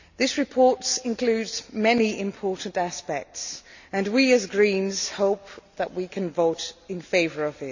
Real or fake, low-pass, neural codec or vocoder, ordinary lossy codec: real; 7.2 kHz; none; none